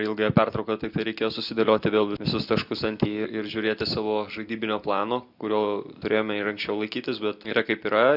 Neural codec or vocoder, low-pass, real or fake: none; 5.4 kHz; real